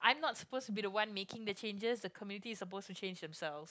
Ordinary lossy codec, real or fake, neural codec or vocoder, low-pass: none; real; none; none